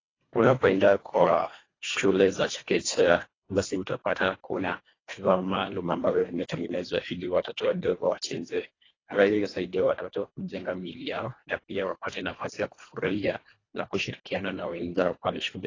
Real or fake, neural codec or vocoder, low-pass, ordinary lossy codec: fake; codec, 24 kHz, 1.5 kbps, HILCodec; 7.2 kHz; AAC, 32 kbps